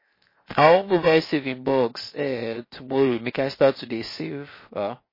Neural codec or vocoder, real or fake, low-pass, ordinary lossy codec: codec, 16 kHz, 0.7 kbps, FocalCodec; fake; 5.4 kHz; MP3, 24 kbps